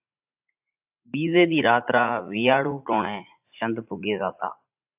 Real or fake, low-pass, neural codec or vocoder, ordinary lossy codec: fake; 3.6 kHz; vocoder, 44.1 kHz, 80 mel bands, Vocos; AAC, 32 kbps